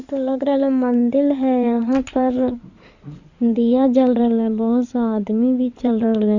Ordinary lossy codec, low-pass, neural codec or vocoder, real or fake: none; 7.2 kHz; codec, 16 kHz in and 24 kHz out, 2.2 kbps, FireRedTTS-2 codec; fake